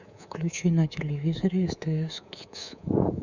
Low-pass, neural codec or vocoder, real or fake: 7.2 kHz; codec, 24 kHz, 3.1 kbps, DualCodec; fake